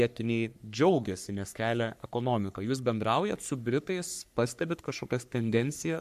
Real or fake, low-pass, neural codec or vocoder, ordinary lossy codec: fake; 14.4 kHz; codec, 44.1 kHz, 3.4 kbps, Pupu-Codec; MP3, 96 kbps